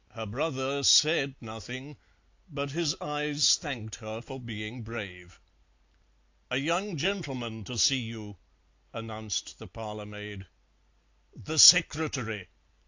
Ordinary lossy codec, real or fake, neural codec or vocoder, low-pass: AAC, 48 kbps; real; none; 7.2 kHz